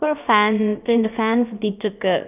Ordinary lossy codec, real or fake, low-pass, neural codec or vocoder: none; fake; 3.6 kHz; codec, 16 kHz, about 1 kbps, DyCAST, with the encoder's durations